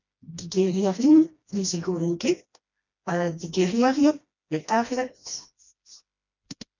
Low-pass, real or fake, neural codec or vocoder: 7.2 kHz; fake; codec, 16 kHz, 1 kbps, FreqCodec, smaller model